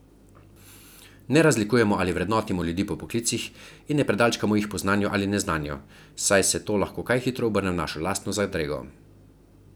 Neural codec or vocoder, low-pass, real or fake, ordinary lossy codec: none; none; real; none